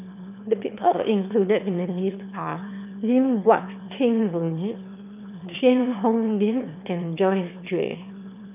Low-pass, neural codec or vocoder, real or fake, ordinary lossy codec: 3.6 kHz; autoencoder, 22.05 kHz, a latent of 192 numbers a frame, VITS, trained on one speaker; fake; none